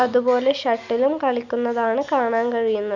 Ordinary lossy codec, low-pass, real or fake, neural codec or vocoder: none; 7.2 kHz; real; none